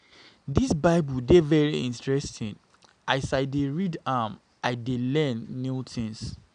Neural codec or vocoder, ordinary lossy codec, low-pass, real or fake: none; none; 9.9 kHz; real